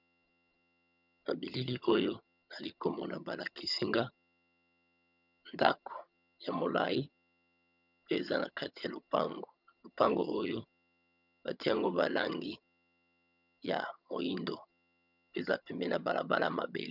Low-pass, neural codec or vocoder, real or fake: 5.4 kHz; vocoder, 22.05 kHz, 80 mel bands, HiFi-GAN; fake